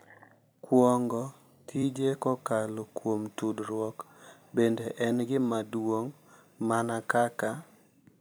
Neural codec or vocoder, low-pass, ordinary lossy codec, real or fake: vocoder, 44.1 kHz, 128 mel bands every 256 samples, BigVGAN v2; none; none; fake